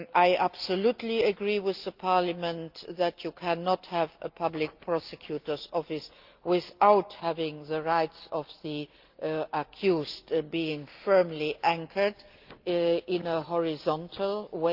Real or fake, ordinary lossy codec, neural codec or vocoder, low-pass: real; Opus, 24 kbps; none; 5.4 kHz